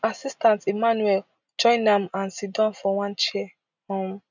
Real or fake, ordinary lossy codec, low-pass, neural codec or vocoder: real; none; 7.2 kHz; none